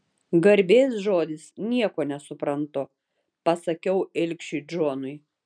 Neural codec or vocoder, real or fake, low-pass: none; real; 9.9 kHz